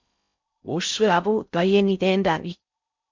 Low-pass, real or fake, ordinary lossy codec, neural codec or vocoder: 7.2 kHz; fake; MP3, 64 kbps; codec, 16 kHz in and 24 kHz out, 0.6 kbps, FocalCodec, streaming, 4096 codes